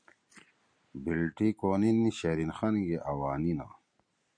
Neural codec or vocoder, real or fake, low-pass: none; real; 9.9 kHz